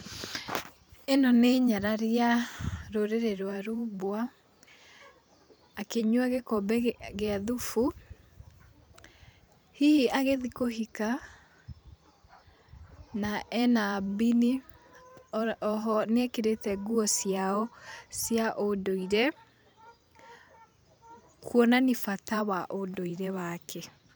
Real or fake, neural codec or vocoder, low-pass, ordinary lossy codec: fake; vocoder, 44.1 kHz, 128 mel bands every 512 samples, BigVGAN v2; none; none